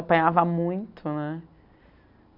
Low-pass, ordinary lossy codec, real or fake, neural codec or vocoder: 5.4 kHz; none; real; none